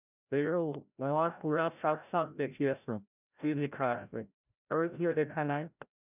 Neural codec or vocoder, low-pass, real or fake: codec, 16 kHz, 0.5 kbps, FreqCodec, larger model; 3.6 kHz; fake